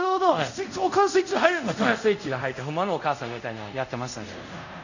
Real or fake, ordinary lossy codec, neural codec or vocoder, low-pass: fake; none; codec, 24 kHz, 0.5 kbps, DualCodec; 7.2 kHz